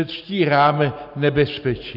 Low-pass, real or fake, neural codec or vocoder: 5.4 kHz; real; none